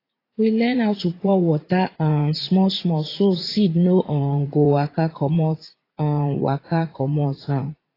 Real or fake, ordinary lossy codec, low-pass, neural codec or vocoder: fake; AAC, 24 kbps; 5.4 kHz; vocoder, 44.1 kHz, 80 mel bands, Vocos